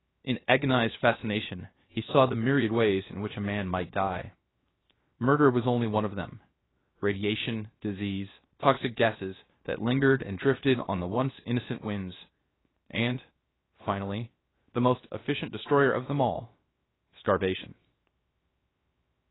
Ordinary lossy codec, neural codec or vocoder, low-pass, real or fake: AAC, 16 kbps; codec, 16 kHz, 0.7 kbps, FocalCodec; 7.2 kHz; fake